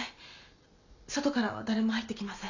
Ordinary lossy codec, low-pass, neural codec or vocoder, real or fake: none; 7.2 kHz; none; real